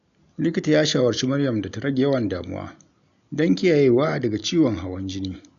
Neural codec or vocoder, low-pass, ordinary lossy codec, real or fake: none; 7.2 kHz; none; real